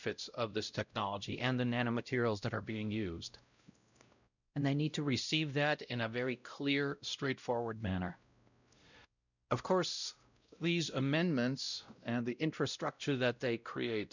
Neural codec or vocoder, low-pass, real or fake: codec, 16 kHz, 0.5 kbps, X-Codec, WavLM features, trained on Multilingual LibriSpeech; 7.2 kHz; fake